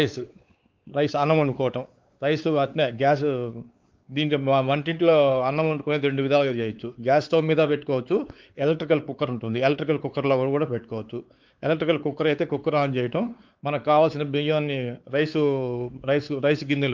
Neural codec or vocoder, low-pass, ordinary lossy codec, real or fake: codec, 16 kHz, 4 kbps, X-Codec, WavLM features, trained on Multilingual LibriSpeech; 7.2 kHz; Opus, 32 kbps; fake